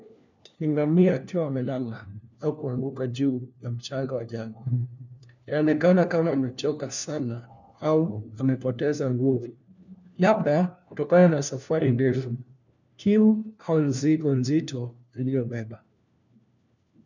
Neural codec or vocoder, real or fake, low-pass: codec, 16 kHz, 1 kbps, FunCodec, trained on LibriTTS, 50 frames a second; fake; 7.2 kHz